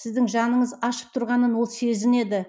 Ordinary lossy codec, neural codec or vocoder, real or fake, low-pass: none; none; real; none